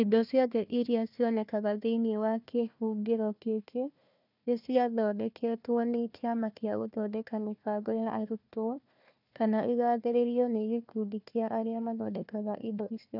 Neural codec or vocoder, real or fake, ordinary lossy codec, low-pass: codec, 16 kHz, 1 kbps, FunCodec, trained on Chinese and English, 50 frames a second; fake; none; 5.4 kHz